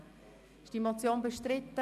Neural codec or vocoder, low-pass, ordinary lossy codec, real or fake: none; 14.4 kHz; none; real